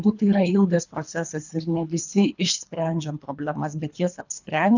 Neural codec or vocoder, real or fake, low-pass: codec, 24 kHz, 3 kbps, HILCodec; fake; 7.2 kHz